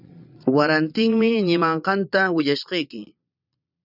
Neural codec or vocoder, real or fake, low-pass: vocoder, 22.05 kHz, 80 mel bands, Vocos; fake; 5.4 kHz